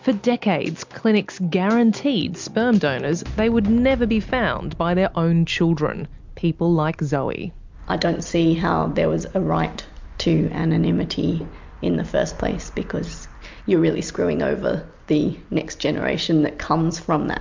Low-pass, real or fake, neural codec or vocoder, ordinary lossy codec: 7.2 kHz; real; none; MP3, 64 kbps